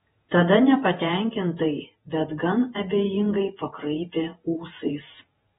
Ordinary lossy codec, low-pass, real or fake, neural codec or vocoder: AAC, 16 kbps; 19.8 kHz; fake; vocoder, 48 kHz, 128 mel bands, Vocos